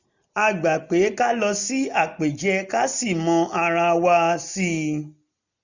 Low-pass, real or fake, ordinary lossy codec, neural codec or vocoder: 7.2 kHz; real; none; none